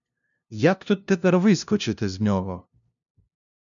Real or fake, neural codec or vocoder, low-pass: fake; codec, 16 kHz, 0.5 kbps, FunCodec, trained on LibriTTS, 25 frames a second; 7.2 kHz